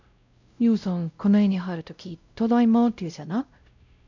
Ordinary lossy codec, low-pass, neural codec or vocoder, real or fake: none; 7.2 kHz; codec, 16 kHz, 0.5 kbps, X-Codec, WavLM features, trained on Multilingual LibriSpeech; fake